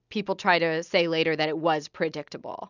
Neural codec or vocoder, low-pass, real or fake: none; 7.2 kHz; real